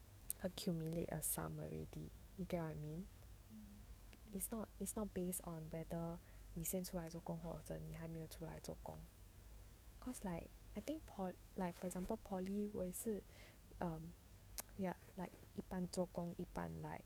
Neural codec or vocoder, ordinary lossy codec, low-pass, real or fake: codec, 44.1 kHz, 7.8 kbps, DAC; none; none; fake